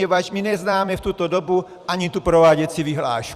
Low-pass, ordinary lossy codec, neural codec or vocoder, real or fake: 14.4 kHz; Opus, 64 kbps; vocoder, 44.1 kHz, 128 mel bands every 256 samples, BigVGAN v2; fake